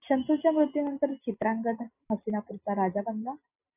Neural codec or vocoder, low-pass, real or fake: none; 3.6 kHz; real